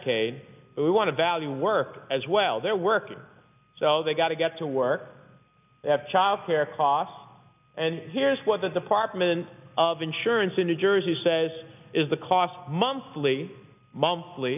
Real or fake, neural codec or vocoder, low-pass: real; none; 3.6 kHz